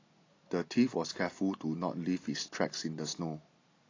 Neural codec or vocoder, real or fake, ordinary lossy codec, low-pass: none; real; AAC, 32 kbps; 7.2 kHz